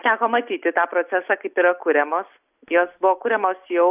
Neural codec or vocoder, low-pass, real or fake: none; 3.6 kHz; real